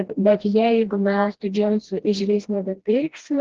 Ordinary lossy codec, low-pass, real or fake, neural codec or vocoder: Opus, 16 kbps; 7.2 kHz; fake; codec, 16 kHz, 1 kbps, FreqCodec, smaller model